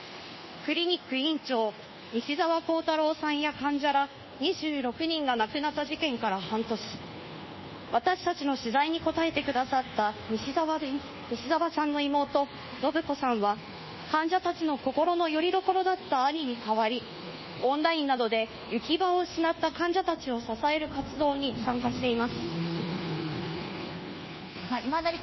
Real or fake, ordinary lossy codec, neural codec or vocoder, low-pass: fake; MP3, 24 kbps; codec, 24 kHz, 1.2 kbps, DualCodec; 7.2 kHz